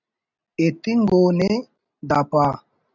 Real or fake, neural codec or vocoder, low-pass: real; none; 7.2 kHz